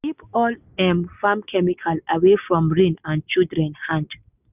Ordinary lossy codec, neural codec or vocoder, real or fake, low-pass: none; none; real; 3.6 kHz